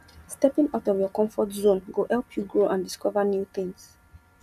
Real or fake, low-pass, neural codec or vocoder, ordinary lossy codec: real; 14.4 kHz; none; none